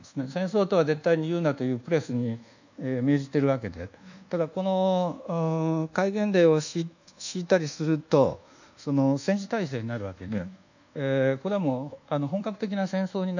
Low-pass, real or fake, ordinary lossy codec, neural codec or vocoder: 7.2 kHz; fake; none; codec, 24 kHz, 1.2 kbps, DualCodec